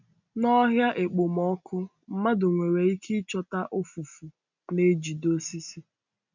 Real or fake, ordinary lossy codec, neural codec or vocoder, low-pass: real; none; none; 7.2 kHz